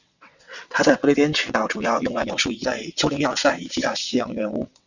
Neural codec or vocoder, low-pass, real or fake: vocoder, 22.05 kHz, 80 mel bands, WaveNeXt; 7.2 kHz; fake